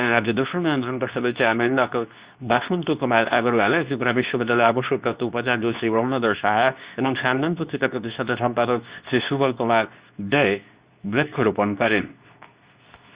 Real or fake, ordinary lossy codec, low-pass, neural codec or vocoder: fake; Opus, 64 kbps; 3.6 kHz; codec, 24 kHz, 0.9 kbps, WavTokenizer, medium speech release version 2